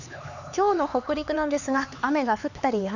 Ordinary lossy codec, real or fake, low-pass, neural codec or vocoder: none; fake; 7.2 kHz; codec, 16 kHz, 4 kbps, X-Codec, HuBERT features, trained on LibriSpeech